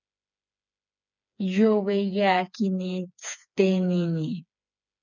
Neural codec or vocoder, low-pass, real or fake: codec, 16 kHz, 4 kbps, FreqCodec, smaller model; 7.2 kHz; fake